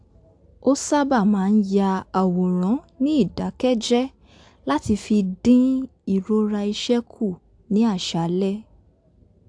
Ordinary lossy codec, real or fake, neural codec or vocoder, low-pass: AAC, 64 kbps; real; none; 9.9 kHz